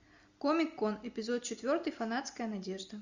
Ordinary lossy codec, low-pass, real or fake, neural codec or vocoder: Opus, 64 kbps; 7.2 kHz; real; none